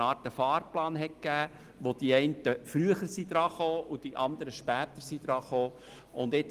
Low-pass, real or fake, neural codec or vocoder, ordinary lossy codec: 14.4 kHz; real; none; Opus, 24 kbps